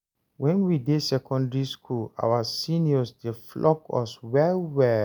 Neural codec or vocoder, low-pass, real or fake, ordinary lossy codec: none; none; real; none